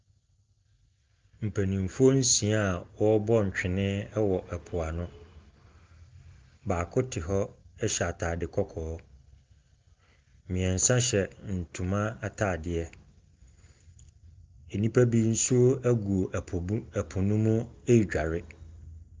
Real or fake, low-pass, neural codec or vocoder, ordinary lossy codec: real; 7.2 kHz; none; Opus, 32 kbps